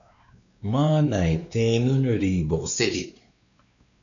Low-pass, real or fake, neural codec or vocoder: 7.2 kHz; fake; codec, 16 kHz, 2 kbps, X-Codec, WavLM features, trained on Multilingual LibriSpeech